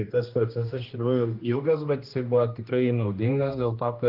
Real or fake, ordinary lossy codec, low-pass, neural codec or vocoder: fake; Opus, 24 kbps; 5.4 kHz; codec, 16 kHz, 1 kbps, X-Codec, HuBERT features, trained on general audio